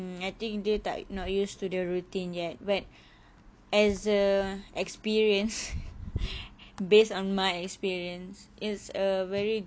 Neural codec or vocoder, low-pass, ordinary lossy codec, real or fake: none; none; none; real